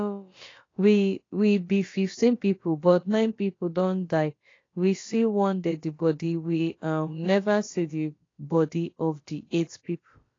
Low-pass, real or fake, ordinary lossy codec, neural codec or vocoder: 7.2 kHz; fake; AAC, 32 kbps; codec, 16 kHz, about 1 kbps, DyCAST, with the encoder's durations